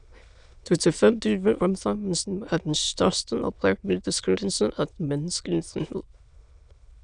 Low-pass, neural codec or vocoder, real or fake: 9.9 kHz; autoencoder, 22.05 kHz, a latent of 192 numbers a frame, VITS, trained on many speakers; fake